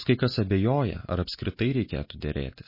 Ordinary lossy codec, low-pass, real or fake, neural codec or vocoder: MP3, 24 kbps; 5.4 kHz; real; none